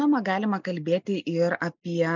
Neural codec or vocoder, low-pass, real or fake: none; 7.2 kHz; real